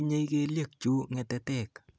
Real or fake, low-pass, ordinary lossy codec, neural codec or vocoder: real; none; none; none